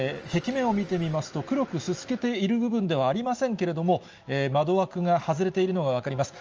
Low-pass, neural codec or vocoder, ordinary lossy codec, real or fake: 7.2 kHz; none; Opus, 24 kbps; real